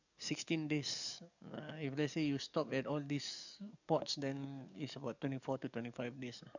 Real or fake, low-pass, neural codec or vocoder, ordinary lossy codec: fake; 7.2 kHz; codec, 44.1 kHz, 7.8 kbps, DAC; none